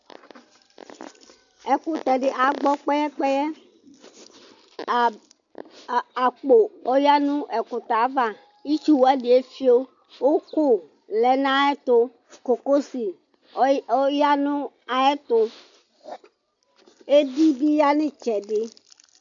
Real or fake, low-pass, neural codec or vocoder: real; 7.2 kHz; none